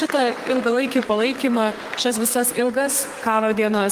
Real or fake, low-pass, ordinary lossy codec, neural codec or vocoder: fake; 14.4 kHz; Opus, 24 kbps; codec, 32 kHz, 1.9 kbps, SNAC